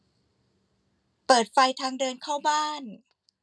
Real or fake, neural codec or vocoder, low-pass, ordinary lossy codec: real; none; none; none